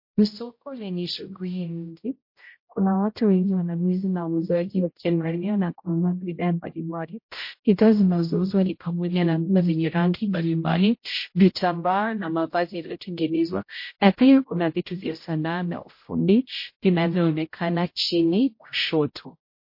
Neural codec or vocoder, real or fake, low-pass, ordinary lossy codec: codec, 16 kHz, 0.5 kbps, X-Codec, HuBERT features, trained on general audio; fake; 5.4 kHz; MP3, 32 kbps